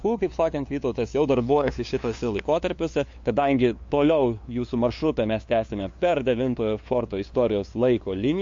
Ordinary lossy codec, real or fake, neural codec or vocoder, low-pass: MP3, 48 kbps; fake; codec, 16 kHz, 2 kbps, FunCodec, trained on LibriTTS, 25 frames a second; 7.2 kHz